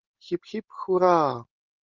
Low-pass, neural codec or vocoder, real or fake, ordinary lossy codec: 7.2 kHz; none; real; Opus, 32 kbps